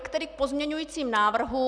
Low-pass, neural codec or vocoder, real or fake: 9.9 kHz; none; real